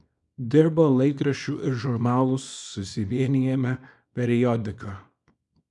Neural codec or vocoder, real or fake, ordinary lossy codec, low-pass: codec, 24 kHz, 0.9 kbps, WavTokenizer, small release; fake; MP3, 96 kbps; 10.8 kHz